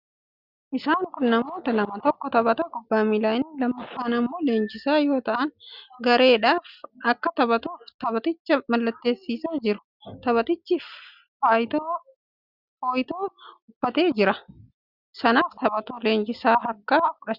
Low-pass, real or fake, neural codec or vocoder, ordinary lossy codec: 5.4 kHz; real; none; Opus, 64 kbps